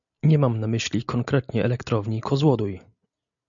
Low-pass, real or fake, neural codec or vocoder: 7.2 kHz; real; none